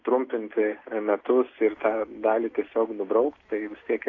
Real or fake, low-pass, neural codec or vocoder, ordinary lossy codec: real; 7.2 kHz; none; AAC, 32 kbps